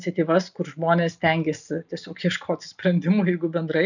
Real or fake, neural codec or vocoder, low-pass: real; none; 7.2 kHz